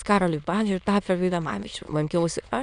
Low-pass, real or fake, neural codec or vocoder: 9.9 kHz; fake; autoencoder, 22.05 kHz, a latent of 192 numbers a frame, VITS, trained on many speakers